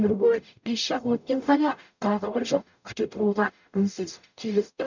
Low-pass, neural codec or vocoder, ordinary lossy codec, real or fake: 7.2 kHz; codec, 44.1 kHz, 0.9 kbps, DAC; none; fake